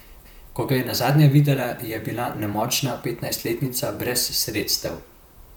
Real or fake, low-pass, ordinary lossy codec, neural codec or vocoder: fake; none; none; vocoder, 44.1 kHz, 128 mel bands, Pupu-Vocoder